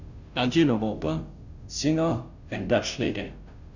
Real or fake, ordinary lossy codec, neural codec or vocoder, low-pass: fake; none; codec, 16 kHz, 0.5 kbps, FunCodec, trained on Chinese and English, 25 frames a second; 7.2 kHz